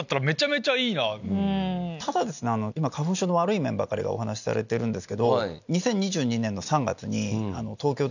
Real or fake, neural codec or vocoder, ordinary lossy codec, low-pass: real; none; none; 7.2 kHz